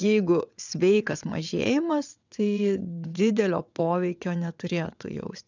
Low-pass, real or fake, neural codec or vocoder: 7.2 kHz; fake; vocoder, 22.05 kHz, 80 mel bands, WaveNeXt